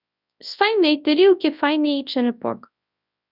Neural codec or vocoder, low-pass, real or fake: codec, 24 kHz, 0.9 kbps, WavTokenizer, large speech release; 5.4 kHz; fake